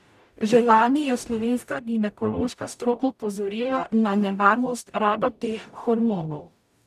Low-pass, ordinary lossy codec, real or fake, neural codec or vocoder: 14.4 kHz; none; fake; codec, 44.1 kHz, 0.9 kbps, DAC